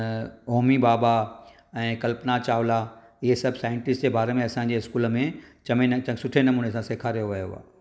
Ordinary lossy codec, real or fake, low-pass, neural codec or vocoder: none; real; none; none